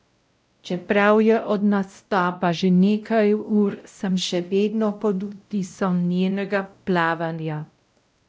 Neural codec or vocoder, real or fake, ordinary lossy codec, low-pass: codec, 16 kHz, 0.5 kbps, X-Codec, WavLM features, trained on Multilingual LibriSpeech; fake; none; none